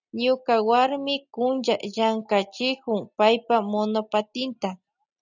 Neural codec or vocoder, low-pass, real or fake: none; 7.2 kHz; real